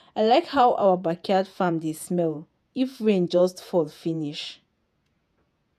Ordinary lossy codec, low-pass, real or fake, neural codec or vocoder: none; 14.4 kHz; fake; vocoder, 48 kHz, 128 mel bands, Vocos